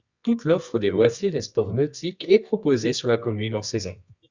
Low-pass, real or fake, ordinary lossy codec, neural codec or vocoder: 7.2 kHz; fake; Opus, 64 kbps; codec, 24 kHz, 0.9 kbps, WavTokenizer, medium music audio release